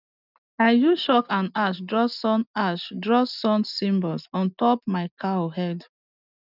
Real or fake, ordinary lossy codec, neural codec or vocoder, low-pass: real; none; none; 5.4 kHz